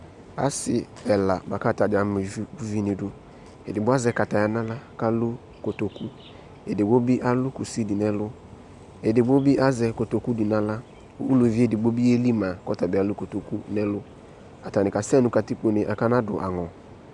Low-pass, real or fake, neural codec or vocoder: 10.8 kHz; real; none